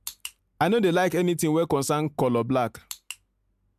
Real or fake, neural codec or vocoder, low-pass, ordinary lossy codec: real; none; 14.4 kHz; MP3, 96 kbps